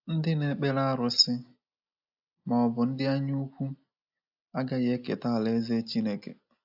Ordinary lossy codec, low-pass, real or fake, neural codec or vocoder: AAC, 48 kbps; 5.4 kHz; real; none